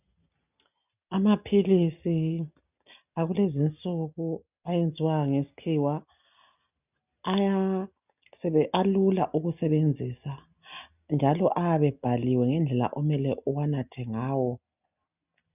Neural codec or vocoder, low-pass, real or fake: none; 3.6 kHz; real